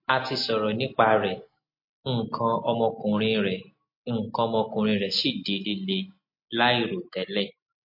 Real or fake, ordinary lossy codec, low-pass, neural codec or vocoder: real; MP3, 32 kbps; 5.4 kHz; none